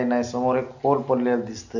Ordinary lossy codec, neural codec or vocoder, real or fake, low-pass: none; none; real; 7.2 kHz